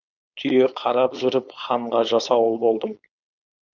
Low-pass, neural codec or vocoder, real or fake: 7.2 kHz; codec, 16 kHz, 4.8 kbps, FACodec; fake